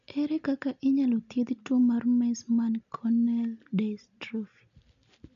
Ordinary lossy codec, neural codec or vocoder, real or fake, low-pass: none; none; real; 7.2 kHz